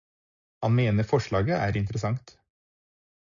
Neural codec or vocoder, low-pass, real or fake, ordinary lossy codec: none; 7.2 kHz; real; MP3, 96 kbps